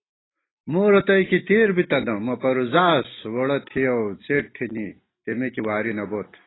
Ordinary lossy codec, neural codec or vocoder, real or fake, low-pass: AAC, 16 kbps; none; real; 7.2 kHz